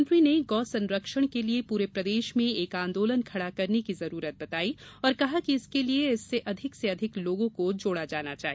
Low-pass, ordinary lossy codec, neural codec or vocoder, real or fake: none; none; none; real